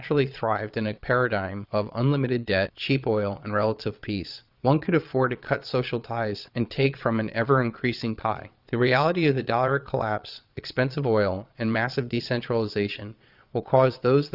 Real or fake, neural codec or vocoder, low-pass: fake; vocoder, 22.05 kHz, 80 mel bands, Vocos; 5.4 kHz